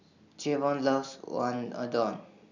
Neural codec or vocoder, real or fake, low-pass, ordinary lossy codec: none; real; 7.2 kHz; none